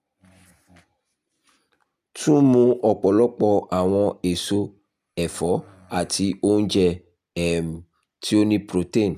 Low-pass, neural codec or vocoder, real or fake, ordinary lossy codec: 14.4 kHz; none; real; none